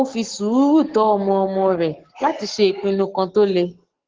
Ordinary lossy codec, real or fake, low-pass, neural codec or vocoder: Opus, 16 kbps; fake; 7.2 kHz; codec, 44.1 kHz, 7.8 kbps, Pupu-Codec